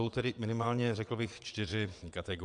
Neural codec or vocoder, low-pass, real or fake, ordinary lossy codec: vocoder, 22.05 kHz, 80 mel bands, WaveNeXt; 9.9 kHz; fake; MP3, 96 kbps